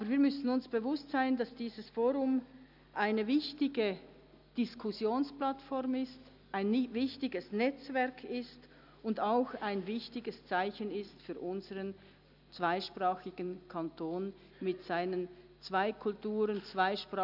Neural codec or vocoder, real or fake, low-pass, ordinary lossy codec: none; real; 5.4 kHz; none